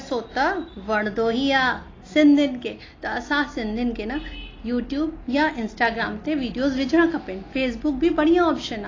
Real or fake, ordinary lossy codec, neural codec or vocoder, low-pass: real; AAC, 32 kbps; none; 7.2 kHz